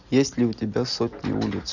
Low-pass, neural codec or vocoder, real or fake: 7.2 kHz; none; real